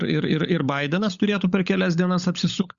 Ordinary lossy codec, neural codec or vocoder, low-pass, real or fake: Opus, 64 kbps; codec, 16 kHz, 16 kbps, FunCodec, trained on LibriTTS, 50 frames a second; 7.2 kHz; fake